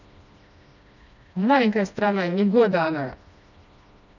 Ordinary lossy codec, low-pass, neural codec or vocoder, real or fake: none; 7.2 kHz; codec, 16 kHz, 1 kbps, FreqCodec, smaller model; fake